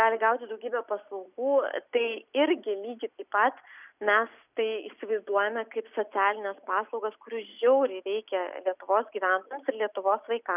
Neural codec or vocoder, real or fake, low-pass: none; real; 3.6 kHz